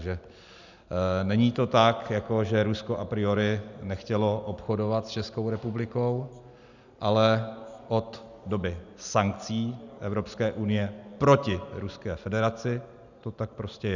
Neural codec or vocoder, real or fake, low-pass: none; real; 7.2 kHz